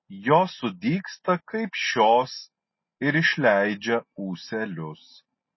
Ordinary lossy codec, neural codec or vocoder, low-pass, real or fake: MP3, 24 kbps; none; 7.2 kHz; real